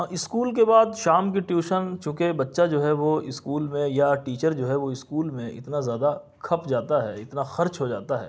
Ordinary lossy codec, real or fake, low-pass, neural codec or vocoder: none; real; none; none